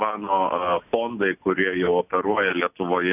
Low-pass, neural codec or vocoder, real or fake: 3.6 kHz; none; real